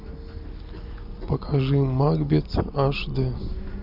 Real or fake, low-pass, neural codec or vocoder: real; 5.4 kHz; none